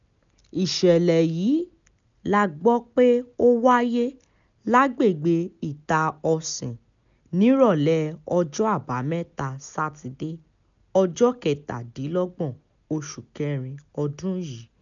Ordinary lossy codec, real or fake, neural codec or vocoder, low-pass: none; real; none; 7.2 kHz